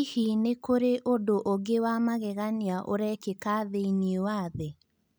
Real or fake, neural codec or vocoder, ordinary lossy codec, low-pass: real; none; none; none